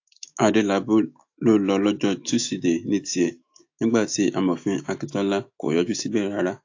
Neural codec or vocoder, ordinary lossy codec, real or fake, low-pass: autoencoder, 48 kHz, 128 numbers a frame, DAC-VAE, trained on Japanese speech; AAC, 48 kbps; fake; 7.2 kHz